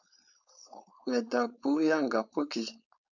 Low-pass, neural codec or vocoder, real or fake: 7.2 kHz; codec, 16 kHz, 4.8 kbps, FACodec; fake